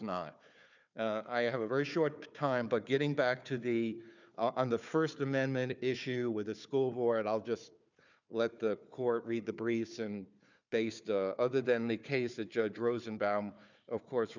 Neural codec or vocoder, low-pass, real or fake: codec, 16 kHz, 4 kbps, FunCodec, trained on Chinese and English, 50 frames a second; 7.2 kHz; fake